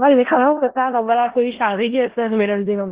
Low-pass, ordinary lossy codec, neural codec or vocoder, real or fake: 3.6 kHz; Opus, 16 kbps; codec, 16 kHz in and 24 kHz out, 0.4 kbps, LongCat-Audio-Codec, four codebook decoder; fake